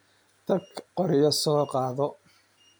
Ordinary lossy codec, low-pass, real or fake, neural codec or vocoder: none; none; real; none